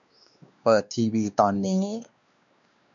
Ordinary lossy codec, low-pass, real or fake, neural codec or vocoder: none; 7.2 kHz; fake; codec, 16 kHz, 2 kbps, X-Codec, WavLM features, trained on Multilingual LibriSpeech